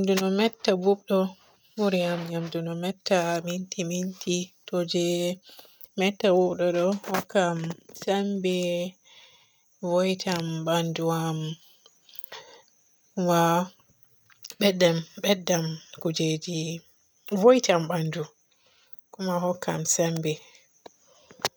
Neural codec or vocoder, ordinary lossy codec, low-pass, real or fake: none; none; none; real